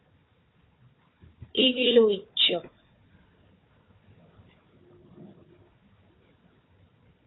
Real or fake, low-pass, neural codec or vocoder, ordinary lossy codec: fake; 7.2 kHz; codec, 16 kHz, 4 kbps, FunCodec, trained on Chinese and English, 50 frames a second; AAC, 16 kbps